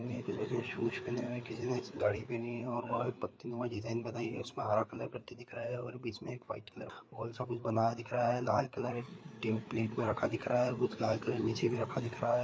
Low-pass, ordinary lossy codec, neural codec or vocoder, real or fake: none; none; codec, 16 kHz, 4 kbps, FreqCodec, larger model; fake